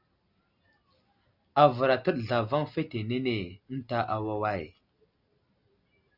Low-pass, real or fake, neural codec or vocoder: 5.4 kHz; real; none